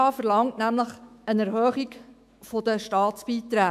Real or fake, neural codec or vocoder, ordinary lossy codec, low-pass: fake; autoencoder, 48 kHz, 128 numbers a frame, DAC-VAE, trained on Japanese speech; AAC, 96 kbps; 14.4 kHz